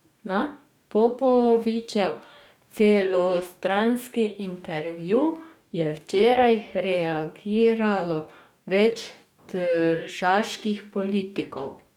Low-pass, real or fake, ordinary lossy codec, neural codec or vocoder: 19.8 kHz; fake; none; codec, 44.1 kHz, 2.6 kbps, DAC